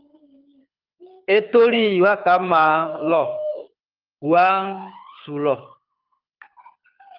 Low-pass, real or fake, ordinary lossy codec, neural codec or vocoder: 5.4 kHz; fake; Opus, 24 kbps; codec, 24 kHz, 6 kbps, HILCodec